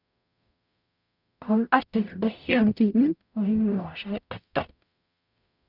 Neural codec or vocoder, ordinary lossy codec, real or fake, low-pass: codec, 44.1 kHz, 0.9 kbps, DAC; none; fake; 5.4 kHz